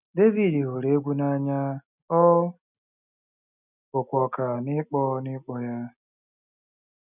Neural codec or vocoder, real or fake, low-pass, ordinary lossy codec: none; real; 3.6 kHz; none